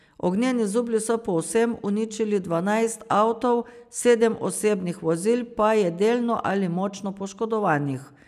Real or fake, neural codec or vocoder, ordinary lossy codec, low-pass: real; none; none; 14.4 kHz